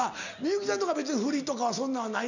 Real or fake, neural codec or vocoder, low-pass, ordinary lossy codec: real; none; 7.2 kHz; none